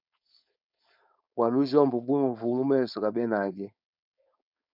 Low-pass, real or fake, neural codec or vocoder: 5.4 kHz; fake; codec, 16 kHz, 4.8 kbps, FACodec